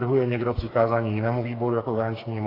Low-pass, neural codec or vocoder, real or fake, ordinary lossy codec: 5.4 kHz; codec, 16 kHz, 4 kbps, FreqCodec, smaller model; fake; AAC, 24 kbps